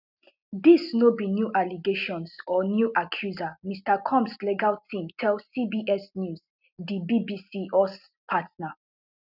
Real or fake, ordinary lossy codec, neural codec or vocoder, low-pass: real; none; none; 5.4 kHz